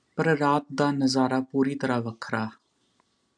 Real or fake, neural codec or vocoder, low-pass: real; none; 9.9 kHz